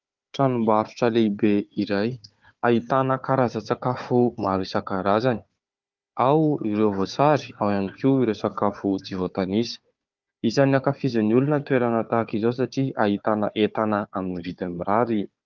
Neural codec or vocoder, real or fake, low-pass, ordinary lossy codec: codec, 16 kHz, 4 kbps, FunCodec, trained on Chinese and English, 50 frames a second; fake; 7.2 kHz; Opus, 24 kbps